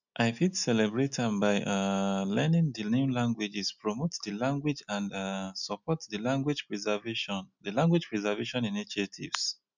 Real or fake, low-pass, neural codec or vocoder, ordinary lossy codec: real; 7.2 kHz; none; none